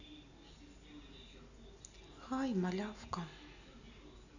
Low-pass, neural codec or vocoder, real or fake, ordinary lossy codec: 7.2 kHz; none; real; none